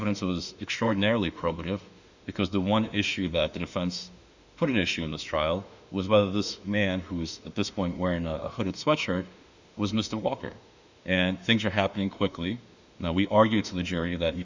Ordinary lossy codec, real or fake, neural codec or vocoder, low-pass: Opus, 64 kbps; fake; autoencoder, 48 kHz, 32 numbers a frame, DAC-VAE, trained on Japanese speech; 7.2 kHz